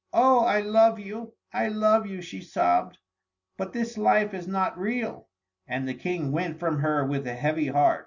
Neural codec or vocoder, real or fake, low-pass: none; real; 7.2 kHz